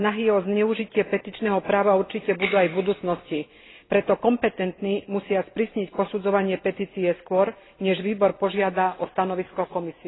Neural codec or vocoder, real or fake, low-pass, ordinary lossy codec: none; real; 7.2 kHz; AAC, 16 kbps